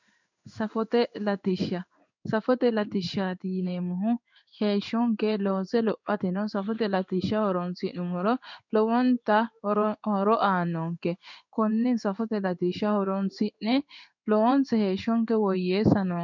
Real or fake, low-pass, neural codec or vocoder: fake; 7.2 kHz; codec, 16 kHz in and 24 kHz out, 1 kbps, XY-Tokenizer